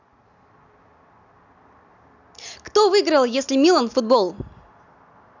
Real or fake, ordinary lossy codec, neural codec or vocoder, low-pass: real; none; none; 7.2 kHz